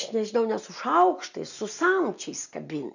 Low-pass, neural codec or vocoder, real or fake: 7.2 kHz; none; real